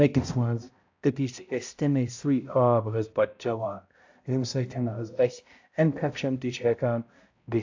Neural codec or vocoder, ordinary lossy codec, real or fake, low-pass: codec, 16 kHz, 0.5 kbps, X-Codec, HuBERT features, trained on balanced general audio; AAC, 48 kbps; fake; 7.2 kHz